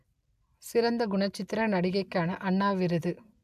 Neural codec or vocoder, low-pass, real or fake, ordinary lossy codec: none; 14.4 kHz; real; AAC, 96 kbps